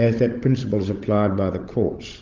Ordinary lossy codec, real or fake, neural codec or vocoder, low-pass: Opus, 32 kbps; fake; codec, 16 kHz, 16 kbps, FunCodec, trained on LibriTTS, 50 frames a second; 7.2 kHz